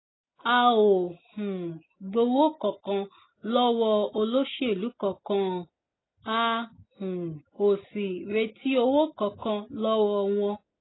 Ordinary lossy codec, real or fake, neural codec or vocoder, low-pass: AAC, 16 kbps; real; none; 7.2 kHz